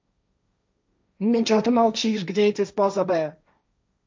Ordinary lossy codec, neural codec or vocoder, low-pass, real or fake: none; codec, 16 kHz, 1.1 kbps, Voila-Tokenizer; none; fake